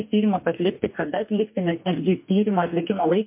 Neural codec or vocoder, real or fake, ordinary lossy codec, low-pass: codec, 44.1 kHz, 2.6 kbps, DAC; fake; MP3, 32 kbps; 3.6 kHz